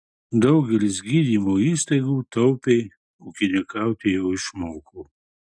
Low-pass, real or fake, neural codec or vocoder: 9.9 kHz; real; none